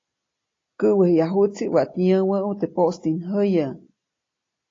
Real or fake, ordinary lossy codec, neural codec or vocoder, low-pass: real; AAC, 32 kbps; none; 7.2 kHz